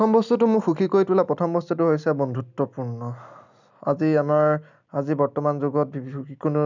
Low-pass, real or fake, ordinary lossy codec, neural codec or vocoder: 7.2 kHz; real; none; none